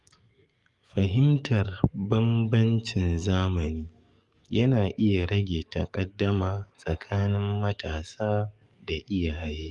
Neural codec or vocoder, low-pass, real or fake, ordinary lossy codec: codec, 44.1 kHz, 7.8 kbps, DAC; 10.8 kHz; fake; Opus, 32 kbps